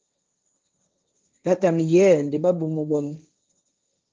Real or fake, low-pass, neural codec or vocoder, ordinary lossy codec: fake; 7.2 kHz; codec, 16 kHz, 1.1 kbps, Voila-Tokenizer; Opus, 24 kbps